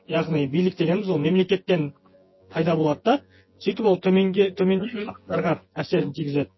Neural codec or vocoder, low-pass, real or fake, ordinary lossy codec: vocoder, 24 kHz, 100 mel bands, Vocos; 7.2 kHz; fake; MP3, 24 kbps